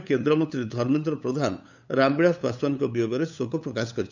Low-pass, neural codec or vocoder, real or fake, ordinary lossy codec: 7.2 kHz; codec, 16 kHz, 8 kbps, FunCodec, trained on LibriTTS, 25 frames a second; fake; none